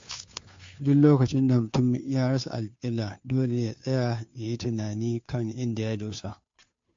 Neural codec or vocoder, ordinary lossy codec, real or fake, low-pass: codec, 16 kHz, 2 kbps, FunCodec, trained on Chinese and English, 25 frames a second; MP3, 48 kbps; fake; 7.2 kHz